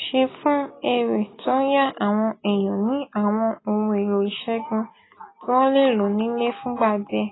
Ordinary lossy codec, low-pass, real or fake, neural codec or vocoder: AAC, 16 kbps; 7.2 kHz; real; none